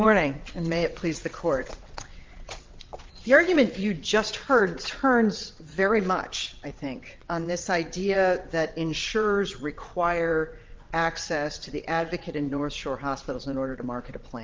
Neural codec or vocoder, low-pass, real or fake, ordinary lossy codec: vocoder, 22.05 kHz, 80 mel bands, WaveNeXt; 7.2 kHz; fake; Opus, 32 kbps